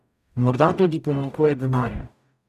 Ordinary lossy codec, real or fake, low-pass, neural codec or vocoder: none; fake; 14.4 kHz; codec, 44.1 kHz, 0.9 kbps, DAC